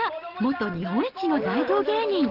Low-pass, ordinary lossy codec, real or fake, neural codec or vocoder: 5.4 kHz; Opus, 16 kbps; real; none